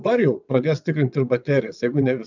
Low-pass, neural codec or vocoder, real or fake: 7.2 kHz; vocoder, 22.05 kHz, 80 mel bands, WaveNeXt; fake